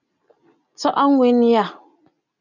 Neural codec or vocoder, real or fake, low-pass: none; real; 7.2 kHz